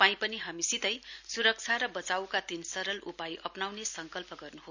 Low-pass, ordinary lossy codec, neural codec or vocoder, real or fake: 7.2 kHz; none; none; real